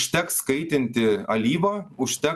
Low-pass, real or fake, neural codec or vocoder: 14.4 kHz; real; none